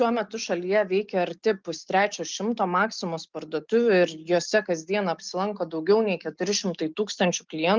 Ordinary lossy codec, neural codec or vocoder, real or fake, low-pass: Opus, 32 kbps; none; real; 7.2 kHz